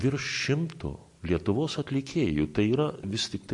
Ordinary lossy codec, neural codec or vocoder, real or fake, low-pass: MP3, 48 kbps; none; real; 10.8 kHz